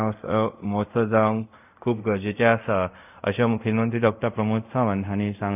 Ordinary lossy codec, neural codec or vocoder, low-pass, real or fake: none; codec, 24 kHz, 0.5 kbps, DualCodec; 3.6 kHz; fake